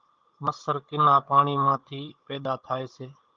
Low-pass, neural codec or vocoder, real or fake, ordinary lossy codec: 7.2 kHz; codec, 16 kHz, 16 kbps, FunCodec, trained on Chinese and English, 50 frames a second; fake; Opus, 32 kbps